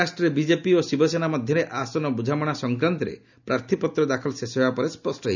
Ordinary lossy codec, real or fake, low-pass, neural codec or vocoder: none; real; 7.2 kHz; none